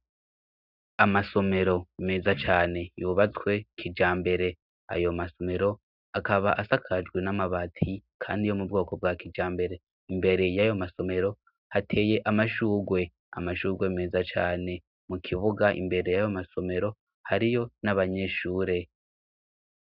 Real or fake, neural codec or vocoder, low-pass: real; none; 5.4 kHz